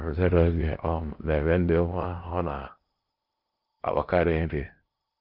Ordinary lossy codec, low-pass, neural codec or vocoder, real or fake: Opus, 24 kbps; 5.4 kHz; codec, 16 kHz in and 24 kHz out, 0.8 kbps, FocalCodec, streaming, 65536 codes; fake